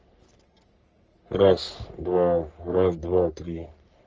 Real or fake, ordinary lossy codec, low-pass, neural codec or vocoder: fake; Opus, 24 kbps; 7.2 kHz; codec, 44.1 kHz, 3.4 kbps, Pupu-Codec